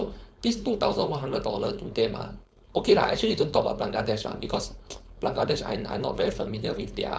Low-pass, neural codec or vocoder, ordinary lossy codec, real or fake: none; codec, 16 kHz, 4.8 kbps, FACodec; none; fake